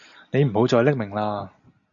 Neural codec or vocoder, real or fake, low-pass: none; real; 7.2 kHz